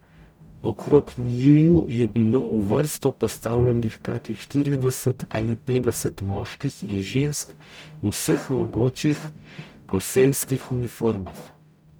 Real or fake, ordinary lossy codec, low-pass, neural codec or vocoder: fake; none; none; codec, 44.1 kHz, 0.9 kbps, DAC